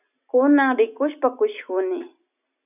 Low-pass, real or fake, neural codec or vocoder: 3.6 kHz; real; none